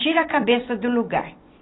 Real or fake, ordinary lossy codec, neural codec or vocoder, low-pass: fake; AAC, 16 kbps; vocoder, 44.1 kHz, 128 mel bands every 256 samples, BigVGAN v2; 7.2 kHz